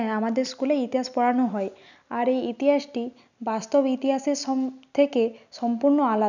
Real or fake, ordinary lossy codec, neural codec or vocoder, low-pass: real; none; none; 7.2 kHz